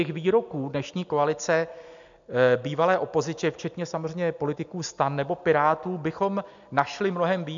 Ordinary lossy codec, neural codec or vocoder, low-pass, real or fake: MP3, 64 kbps; none; 7.2 kHz; real